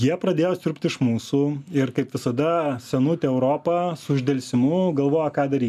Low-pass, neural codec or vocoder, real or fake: 14.4 kHz; none; real